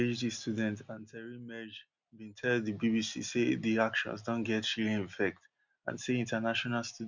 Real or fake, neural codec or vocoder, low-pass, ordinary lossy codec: real; none; 7.2 kHz; Opus, 64 kbps